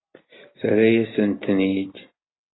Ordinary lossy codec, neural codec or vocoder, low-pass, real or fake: AAC, 16 kbps; none; 7.2 kHz; real